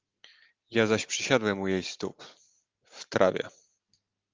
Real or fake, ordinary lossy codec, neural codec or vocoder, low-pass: real; Opus, 32 kbps; none; 7.2 kHz